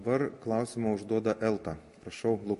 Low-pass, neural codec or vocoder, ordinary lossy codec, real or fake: 14.4 kHz; none; MP3, 48 kbps; real